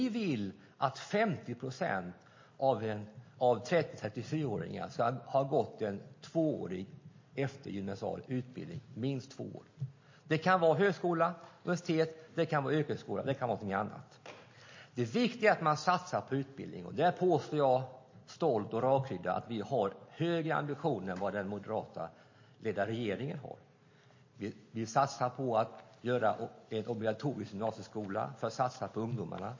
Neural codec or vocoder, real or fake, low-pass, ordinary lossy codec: none; real; 7.2 kHz; MP3, 32 kbps